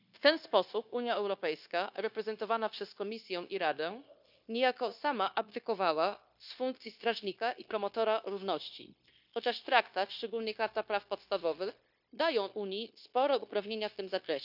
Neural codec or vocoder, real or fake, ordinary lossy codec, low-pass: codec, 16 kHz, 0.9 kbps, LongCat-Audio-Codec; fake; none; 5.4 kHz